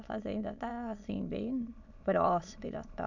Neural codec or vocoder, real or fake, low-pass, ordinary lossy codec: autoencoder, 22.05 kHz, a latent of 192 numbers a frame, VITS, trained on many speakers; fake; 7.2 kHz; none